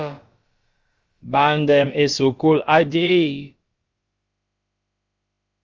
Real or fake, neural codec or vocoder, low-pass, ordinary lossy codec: fake; codec, 16 kHz, about 1 kbps, DyCAST, with the encoder's durations; 7.2 kHz; Opus, 32 kbps